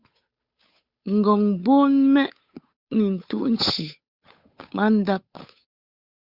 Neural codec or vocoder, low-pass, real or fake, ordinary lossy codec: codec, 16 kHz, 8 kbps, FunCodec, trained on Chinese and English, 25 frames a second; 5.4 kHz; fake; Opus, 64 kbps